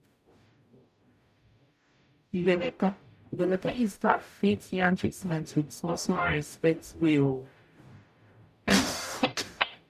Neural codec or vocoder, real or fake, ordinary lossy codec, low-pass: codec, 44.1 kHz, 0.9 kbps, DAC; fake; none; 14.4 kHz